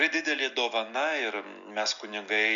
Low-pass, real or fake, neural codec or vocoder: 7.2 kHz; real; none